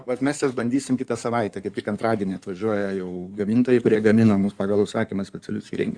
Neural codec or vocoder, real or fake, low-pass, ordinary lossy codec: codec, 16 kHz in and 24 kHz out, 2.2 kbps, FireRedTTS-2 codec; fake; 9.9 kHz; Opus, 64 kbps